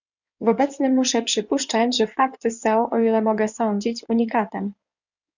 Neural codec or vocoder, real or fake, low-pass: codec, 16 kHz in and 24 kHz out, 2.2 kbps, FireRedTTS-2 codec; fake; 7.2 kHz